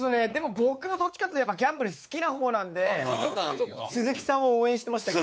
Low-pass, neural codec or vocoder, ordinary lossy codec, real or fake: none; codec, 16 kHz, 4 kbps, X-Codec, WavLM features, trained on Multilingual LibriSpeech; none; fake